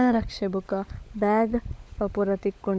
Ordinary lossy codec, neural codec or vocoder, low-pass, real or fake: none; codec, 16 kHz, 16 kbps, FunCodec, trained on LibriTTS, 50 frames a second; none; fake